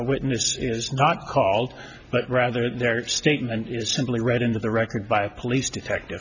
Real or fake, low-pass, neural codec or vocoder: real; 7.2 kHz; none